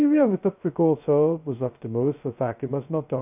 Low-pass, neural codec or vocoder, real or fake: 3.6 kHz; codec, 16 kHz, 0.2 kbps, FocalCodec; fake